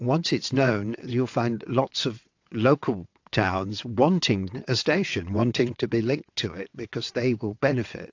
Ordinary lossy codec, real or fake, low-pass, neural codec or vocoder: AAC, 48 kbps; fake; 7.2 kHz; vocoder, 22.05 kHz, 80 mel bands, WaveNeXt